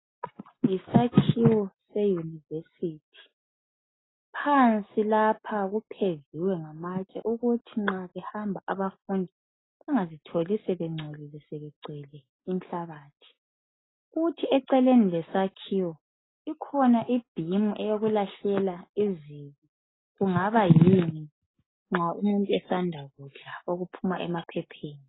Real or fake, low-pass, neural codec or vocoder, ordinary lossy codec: real; 7.2 kHz; none; AAC, 16 kbps